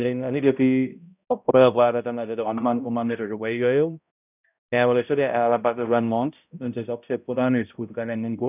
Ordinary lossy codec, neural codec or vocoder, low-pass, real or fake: none; codec, 16 kHz, 0.5 kbps, X-Codec, HuBERT features, trained on balanced general audio; 3.6 kHz; fake